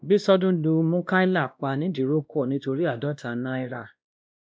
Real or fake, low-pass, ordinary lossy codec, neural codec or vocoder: fake; none; none; codec, 16 kHz, 1 kbps, X-Codec, WavLM features, trained on Multilingual LibriSpeech